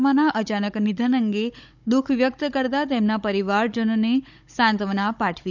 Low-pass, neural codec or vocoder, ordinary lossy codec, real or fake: 7.2 kHz; codec, 16 kHz, 16 kbps, FunCodec, trained on Chinese and English, 50 frames a second; none; fake